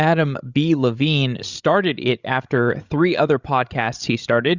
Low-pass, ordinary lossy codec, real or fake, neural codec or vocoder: 7.2 kHz; Opus, 64 kbps; fake; codec, 16 kHz, 16 kbps, FreqCodec, larger model